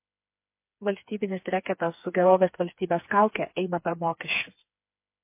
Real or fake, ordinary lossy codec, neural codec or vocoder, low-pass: fake; MP3, 24 kbps; codec, 16 kHz, 4 kbps, FreqCodec, smaller model; 3.6 kHz